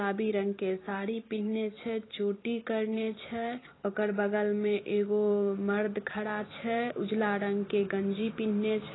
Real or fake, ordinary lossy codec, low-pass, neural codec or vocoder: fake; AAC, 16 kbps; 7.2 kHz; vocoder, 44.1 kHz, 128 mel bands every 256 samples, BigVGAN v2